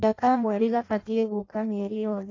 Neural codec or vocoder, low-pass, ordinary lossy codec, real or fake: codec, 16 kHz in and 24 kHz out, 0.6 kbps, FireRedTTS-2 codec; 7.2 kHz; AAC, 32 kbps; fake